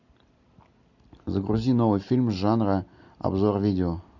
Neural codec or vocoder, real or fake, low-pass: none; real; 7.2 kHz